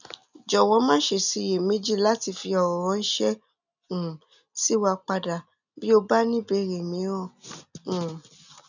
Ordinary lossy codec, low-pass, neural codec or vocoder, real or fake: none; 7.2 kHz; none; real